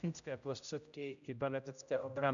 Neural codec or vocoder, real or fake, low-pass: codec, 16 kHz, 0.5 kbps, X-Codec, HuBERT features, trained on general audio; fake; 7.2 kHz